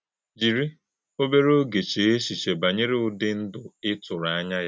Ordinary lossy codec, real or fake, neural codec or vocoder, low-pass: none; real; none; none